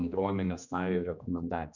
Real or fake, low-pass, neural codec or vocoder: fake; 7.2 kHz; codec, 16 kHz, 2 kbps, X-Codec, HuBERT features, trained on general audio